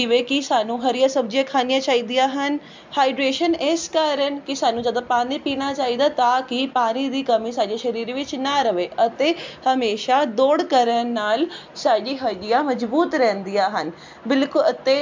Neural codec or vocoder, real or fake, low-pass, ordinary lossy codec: none; real; 7.2 kHz; MP3, 64 kbps